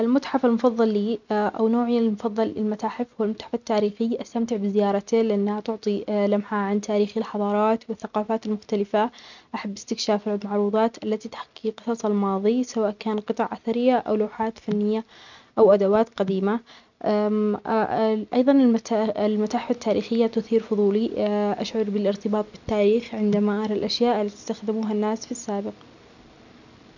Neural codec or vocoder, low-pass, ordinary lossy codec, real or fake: none; 7.2 kHz; none; real